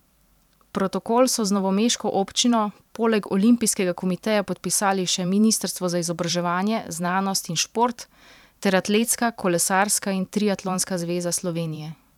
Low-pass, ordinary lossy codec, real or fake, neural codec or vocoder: 19.8 kHz; none; fake; vocoder, 44.1 kHz, 128 mel bands every 512 samples, BigVGAN v2